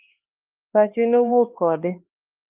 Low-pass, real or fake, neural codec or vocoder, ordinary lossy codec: 3.6 kHz; fake; codec, 16 kHz, 2 kbps, X-Codec, HuBERT features, trained on LibriSpeech; Opus, 32 kbps